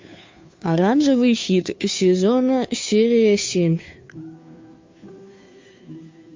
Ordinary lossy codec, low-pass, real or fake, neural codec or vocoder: MP3, 48 kbps; 7.2 kHz; fake; codec, 16 kHz, 2 kbps, FunCodec, trained on Chinese and English, 25 frames a second